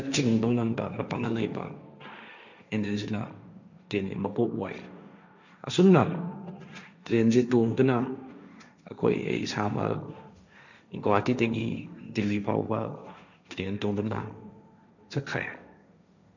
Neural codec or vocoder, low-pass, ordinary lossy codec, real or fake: codec, 16 kHz, 1.1 kbps, Voila-Tokenizer; 7.2 kHz; none; fake